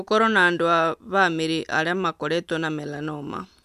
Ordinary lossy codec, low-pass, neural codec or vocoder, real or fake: none; 14.4 kHz; none; real